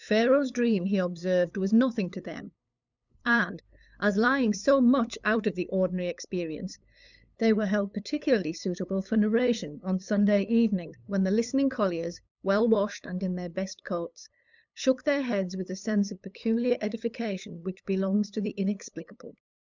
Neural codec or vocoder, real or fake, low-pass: codec, 16 kHz, 8 kbps, FunCodec, trained on LibriTTS, 25 frames a second; fake; 7.2 kHz